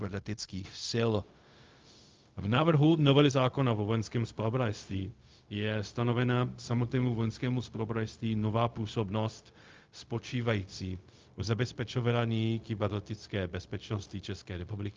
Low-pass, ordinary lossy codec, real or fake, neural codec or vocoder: 7.2 kHz; Opus, 24 kbps; fake; codec, 16 kHz, 0.4 kbps, LongCat-Audio-Codec